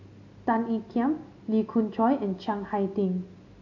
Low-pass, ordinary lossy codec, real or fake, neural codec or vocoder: 7.2 kHz; AAC, 48 kbps; real; none